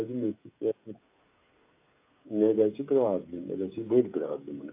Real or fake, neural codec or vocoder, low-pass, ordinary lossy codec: fake; codec, 16 kHz, 16 kbps, FreqCodec, smaller model; 3.6 kHz; AAC, 24 kbps